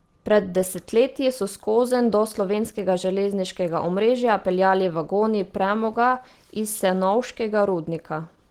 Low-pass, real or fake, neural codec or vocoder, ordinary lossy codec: 19.8 kHz; real; none; Opus, 16 kbps